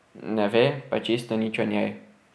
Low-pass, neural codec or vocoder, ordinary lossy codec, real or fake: none; none; none; real